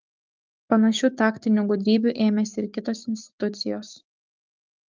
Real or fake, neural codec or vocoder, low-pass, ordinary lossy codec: fake; codec, 16 kHz, 6 kbps, DAC; 7.2 kHz; Opus, 32 kbps